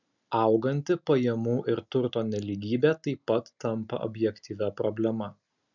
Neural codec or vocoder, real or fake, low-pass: none; real; 7.2 kHz